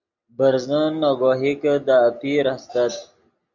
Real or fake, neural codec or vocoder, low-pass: real; none; 7.2 kHz